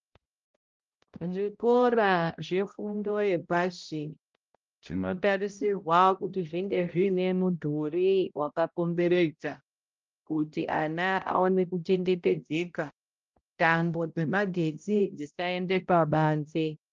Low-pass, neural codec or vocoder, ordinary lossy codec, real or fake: 7.2 kHz; codec, 16 kHz, 0.5 kbps, X-Codec, HuBERT features, trained on balanced general audio; Opus, 32 kbps; fake